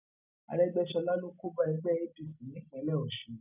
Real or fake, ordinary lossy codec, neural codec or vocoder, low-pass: real; none; none; 3.6 kHz